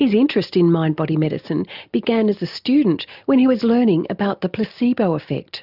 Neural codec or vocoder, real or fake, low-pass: none; real; 5.4 kHz